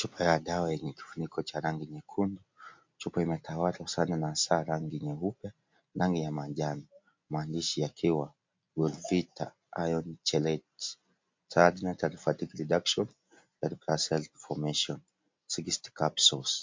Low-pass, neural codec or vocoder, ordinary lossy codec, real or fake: 7.2 kHz; none; MP3, 48 kbps; real